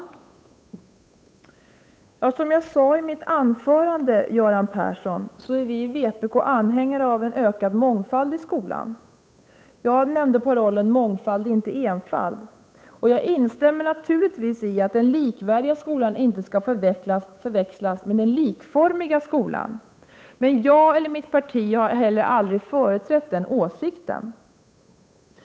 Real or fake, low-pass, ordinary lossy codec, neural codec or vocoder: fake; none; none; codec, 16 kHz, 8 kbps, FunCodec, trained on Chinese and English, 25 frames a second